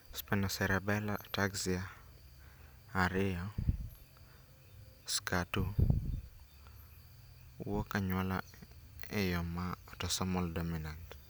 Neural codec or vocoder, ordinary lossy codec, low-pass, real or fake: none; none; none; real